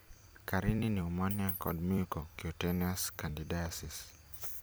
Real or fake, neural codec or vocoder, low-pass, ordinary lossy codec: fake; vocoder, 44.1 kHz, 128 mel bands every 256 samples, BigVGAN v2; none; none